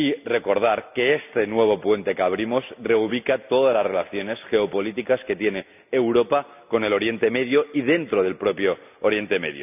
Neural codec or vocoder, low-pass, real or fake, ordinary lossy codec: none; 3.6 kHz; real; none